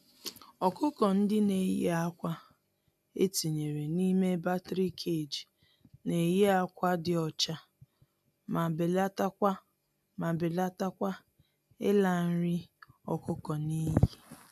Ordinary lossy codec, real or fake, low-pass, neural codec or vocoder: none; real; 14.4 kHz; none